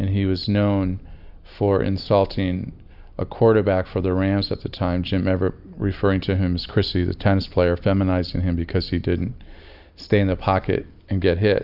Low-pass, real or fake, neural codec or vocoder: 5.4 kHz; real; none